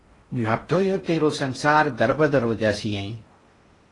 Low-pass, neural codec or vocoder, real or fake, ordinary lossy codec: 10.8 kHz; codec, 16 kHz in and 24 kHz out, 0.6 kbps, FocalCodec, streaming, 4096 codes; fake; AAC, 32 kbps